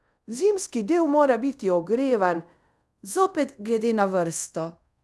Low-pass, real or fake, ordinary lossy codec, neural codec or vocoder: none; fake; none; codec, 24 kHz, 0.5 kbps, DualCodec